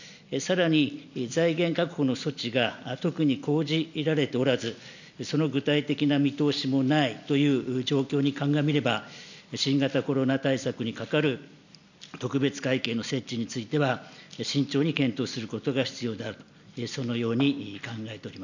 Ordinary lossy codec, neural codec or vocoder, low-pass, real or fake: none; none; 7.2 kHz; real